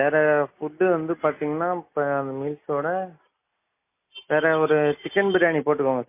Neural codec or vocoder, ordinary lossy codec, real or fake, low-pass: none; MP3, 24 kbps; real; 3.6 kHz